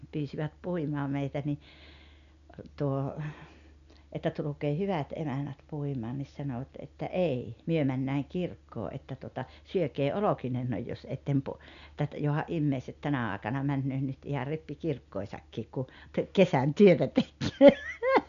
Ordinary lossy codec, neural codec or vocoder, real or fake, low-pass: none; none; real; 7.2 kHz